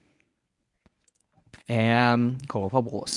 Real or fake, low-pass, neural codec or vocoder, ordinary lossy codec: fake; 10.8 kHz; codec, 24 kHz, 0.9 kbps, WavTokenizer, medium speech release version 1; none